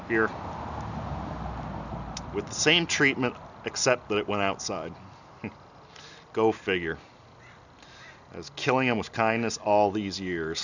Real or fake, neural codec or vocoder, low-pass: real; none; 7.2 kHz